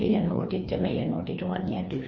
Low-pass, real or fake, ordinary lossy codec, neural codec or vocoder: 7.2 kHz; fake; MP3, 32 kbps; codec, 16 kHz, 2 kbps, FreqCodec, larger model